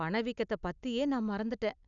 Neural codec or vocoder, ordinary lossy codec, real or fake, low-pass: none; none; real; 7.2 kHz